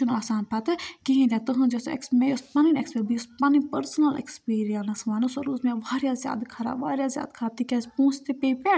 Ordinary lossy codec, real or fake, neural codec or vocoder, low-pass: none; real; none; none